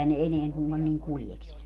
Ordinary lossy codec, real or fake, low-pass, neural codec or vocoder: Opus, 24 kbps; real; 14.4 kHz; none